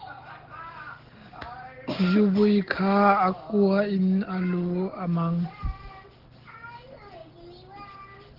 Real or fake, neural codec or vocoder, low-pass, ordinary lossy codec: real; none; 5.4 kHz; Opus, 16 kbps